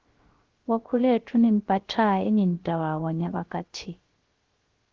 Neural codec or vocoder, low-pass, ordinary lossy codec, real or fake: codec, 16 kHz, 0.3 kbps, FocalCodec; 7.2 kHz; Opus, 16 kbps; fake